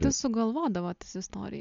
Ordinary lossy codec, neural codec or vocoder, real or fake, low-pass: MP3, 64 kbps; none; real; 7.2 kHz